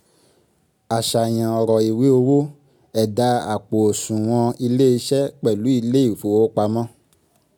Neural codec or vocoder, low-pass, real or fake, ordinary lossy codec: none; none; real; none